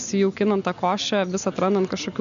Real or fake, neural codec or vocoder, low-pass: real; none; 7.2 kHz